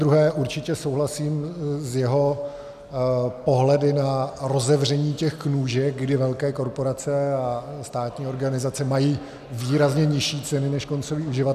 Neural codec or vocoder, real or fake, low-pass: none; real; 14.4 kHz